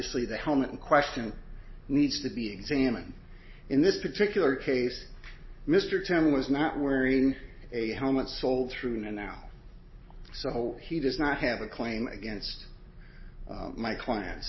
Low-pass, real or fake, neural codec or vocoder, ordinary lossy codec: 7.2 kHz; real; none; MP3, 24 kbps